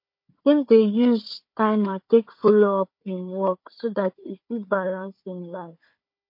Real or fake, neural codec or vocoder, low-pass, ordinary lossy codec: fake; codec, 16 kHz, 4 kbps, FunCodec, trained on Chinese and English, 50 frames a second; 5.4 kHz; MP3, 32 kbps